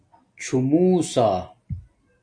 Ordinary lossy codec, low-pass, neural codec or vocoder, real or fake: AAC, 64 kbps; 9.9 kHz; none; real